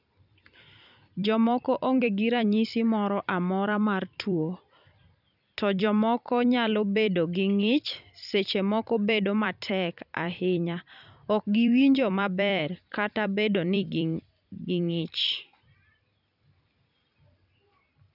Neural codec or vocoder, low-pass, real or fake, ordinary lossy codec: vocoder, 44.1 kHz, 128 mel bands every 256 samples, BigVGAN v2; 5.4 kHz; fake; none